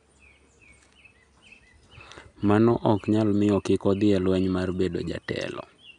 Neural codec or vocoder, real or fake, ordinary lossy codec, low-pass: none; real; none; 10.8 kHz